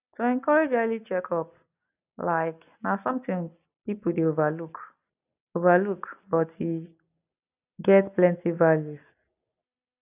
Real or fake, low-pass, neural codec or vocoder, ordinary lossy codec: real; 3.6 kHz; none; none